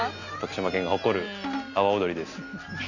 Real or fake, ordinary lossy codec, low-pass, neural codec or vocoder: real; none; 7.2 kHz; none